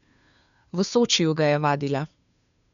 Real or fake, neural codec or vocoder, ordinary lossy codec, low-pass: fake; codec, 16 kHz, 2 kbps, FunCodec, trained on Chinese and English, 25 frames a second; none; 7.2 kHz